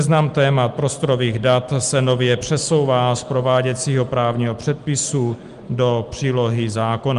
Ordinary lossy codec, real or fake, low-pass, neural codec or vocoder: Opus, 32 kbps; real; 10.8 kHz; none